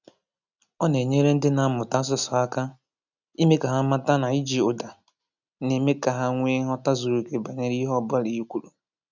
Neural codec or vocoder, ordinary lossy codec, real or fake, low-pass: none; none; real; 7.2 kHz